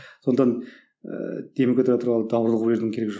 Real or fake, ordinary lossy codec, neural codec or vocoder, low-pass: real; none; none; none